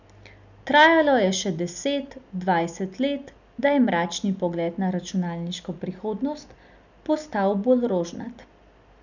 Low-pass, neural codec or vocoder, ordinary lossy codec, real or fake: 7.2 kHz; none; none; real